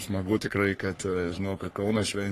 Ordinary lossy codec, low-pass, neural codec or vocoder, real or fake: AAC, 48 kbps; 14.4 kHz; codec, 44.1 kHz, 3.4 kbps, Pupu-Codec; fake